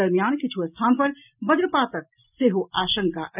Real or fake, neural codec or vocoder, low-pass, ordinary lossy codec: real; none; 3.6 kHz; none